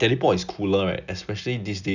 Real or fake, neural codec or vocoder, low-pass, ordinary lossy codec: real; none; 7.2 kHz; none